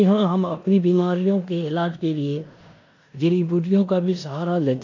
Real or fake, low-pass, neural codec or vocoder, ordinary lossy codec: fake; 7.2 kHz; codec, 16 kHz in and 24 kHz out, 0.9 kbps, LongCat-Audio-Codec, four codebook decoder; AAC, 48 kbps